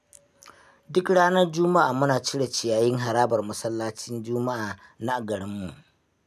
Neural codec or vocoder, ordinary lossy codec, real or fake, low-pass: none; none; real; 14.4 kHz